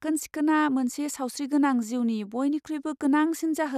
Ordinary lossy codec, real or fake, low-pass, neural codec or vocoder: none; real; 14.4 kHz; none